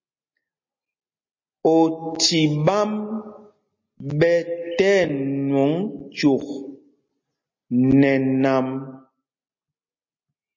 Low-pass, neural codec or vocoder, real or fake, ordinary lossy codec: 7.2 kHz; none; real; MP3, 32 kbps